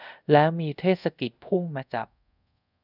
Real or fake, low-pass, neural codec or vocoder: fake; 5.4 kHz; codec, 24 kHz, 0.5 kbps, DualCodec